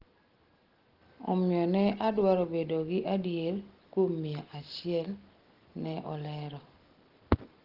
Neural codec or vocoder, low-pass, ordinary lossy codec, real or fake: none; 5.4 kHz; Opus, 16 kbps; real